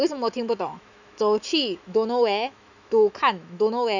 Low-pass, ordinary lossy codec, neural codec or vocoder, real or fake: 7.2 kHz; none; autoencoder, 48 kHz, 128 numbers a frame, DAC-VAE, trained on Japanese speech; fake